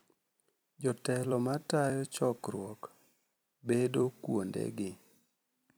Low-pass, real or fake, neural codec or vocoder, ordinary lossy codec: none; fake; vocoder, 44.1 kHz, 128 mel bands every 256 samples, BigVGAN v2; none